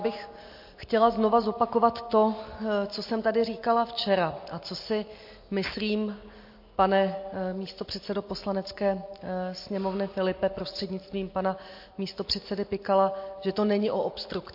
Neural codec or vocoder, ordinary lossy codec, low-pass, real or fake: none; MP3, 32 kbps; 5.4 kHz; real